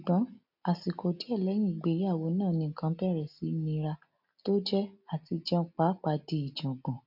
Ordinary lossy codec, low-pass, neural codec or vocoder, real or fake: none; 5.4 kHz; none; real